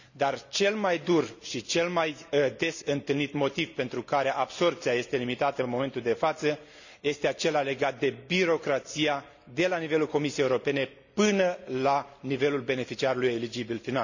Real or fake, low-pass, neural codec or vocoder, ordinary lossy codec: real; 7.2 kHz; none; none